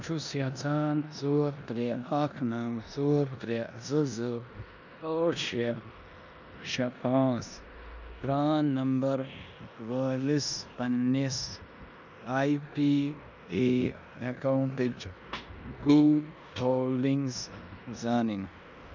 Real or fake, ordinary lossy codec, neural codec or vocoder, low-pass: fake; none; codec, 16 kHz in and 24 kHz out, 0.9 kbps, LongCat-Audio-Codec, four codebook decoder; 7.2 kHz